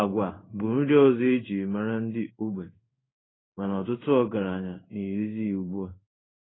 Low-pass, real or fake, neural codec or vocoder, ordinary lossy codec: 7.2 kHz; fake; codec, 16 kHz in and 24 kHz out, 1 kbps, XY-Tokenizer; AAC, 16 kbps